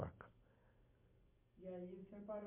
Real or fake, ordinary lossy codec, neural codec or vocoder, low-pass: real; MP3, 24 kbps; none; 3.6 kHz